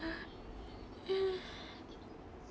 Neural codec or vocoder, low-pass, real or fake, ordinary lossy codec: none; none; real; none